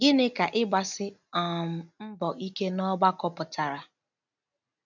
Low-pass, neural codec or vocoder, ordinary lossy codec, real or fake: 7.2 kHz; none; none; real